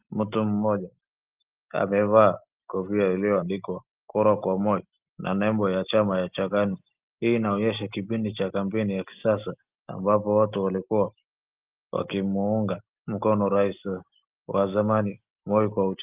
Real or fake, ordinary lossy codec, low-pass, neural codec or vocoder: real; Opus, 24 kbps; 3.6 kHz; none